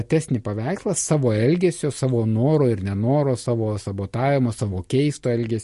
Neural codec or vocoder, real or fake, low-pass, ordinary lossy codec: none; real; 14.4 kHz; MP3, 48 kbps